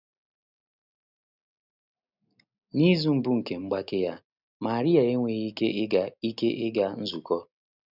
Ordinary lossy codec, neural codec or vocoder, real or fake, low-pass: none; none; real; 5.4 kHz